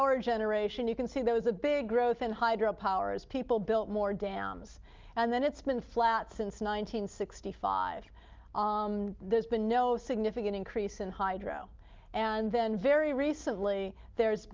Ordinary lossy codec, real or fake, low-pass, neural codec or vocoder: Opus, 24 kbps; real; 7.2 kHz; none